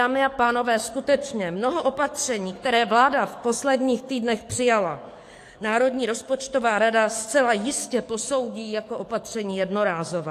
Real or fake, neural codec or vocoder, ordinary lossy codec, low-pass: fake; codec, 44.1 kHz, 7.8 kbps, DAC; AAC, 64 kbps; 14.4 kHz